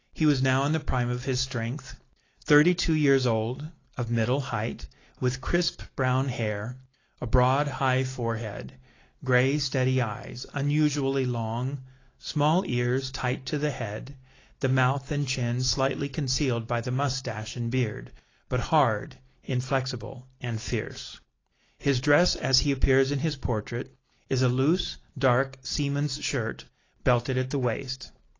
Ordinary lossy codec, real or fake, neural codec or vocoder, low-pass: AAC, 32 kbps; real; none; 7.2 kHz